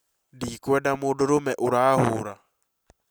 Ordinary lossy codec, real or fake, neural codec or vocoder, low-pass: none; real; none; none